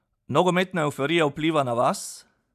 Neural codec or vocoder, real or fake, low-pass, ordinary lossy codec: none; real; 14.4 kHz; AAC, 96 kbps